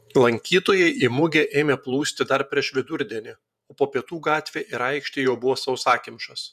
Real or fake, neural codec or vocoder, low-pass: fake; vocoder, 48 kHz, 128 mel bands, Vocos; 14.4 kHz